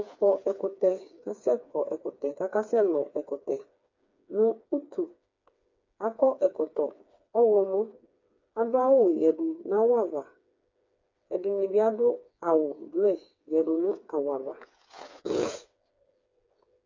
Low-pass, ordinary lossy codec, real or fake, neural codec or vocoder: 7.2 kHz; MP3, 48 kbps; fake; codec, 16 kHz, 4 kbps, FreqCodec, smaller model